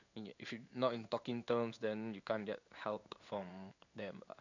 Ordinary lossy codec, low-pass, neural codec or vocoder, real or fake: none; 7.2 kHz; codec, 16 kHz in and 24 kHz out, 1 kbps, XY-Tokenizer; fake